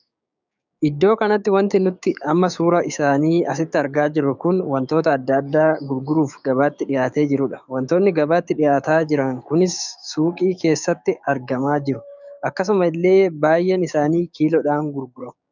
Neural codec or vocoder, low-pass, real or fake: codec, 16 kHz, 6 kbps, DAC; 7.2 kHz; fake